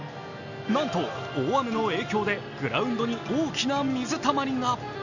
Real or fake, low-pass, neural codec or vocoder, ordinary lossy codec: real; 7.2 kHz; none; none